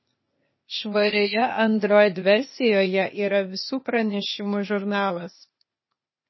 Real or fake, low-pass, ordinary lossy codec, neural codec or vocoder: fake; 7.2 kHz; MP3, 24 kbps; codec, 16 kHz, 0.8 kbps, ZipCodec